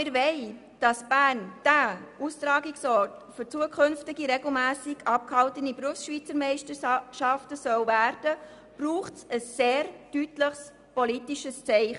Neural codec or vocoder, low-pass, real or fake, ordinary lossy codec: none; 10.8 kHz; real; none